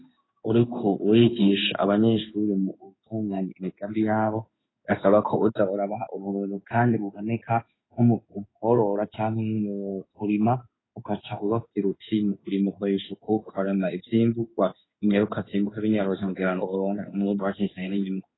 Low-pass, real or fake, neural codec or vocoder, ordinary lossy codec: 7.2 kHz; fake; codec, 16 kHz in and 24 kHz out, 1 kbps, XY-Tokenizer; AAC, 16 kbps